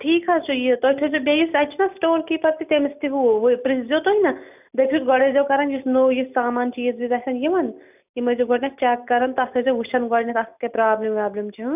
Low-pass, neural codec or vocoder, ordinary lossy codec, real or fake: 3.6 kHz; none; none; real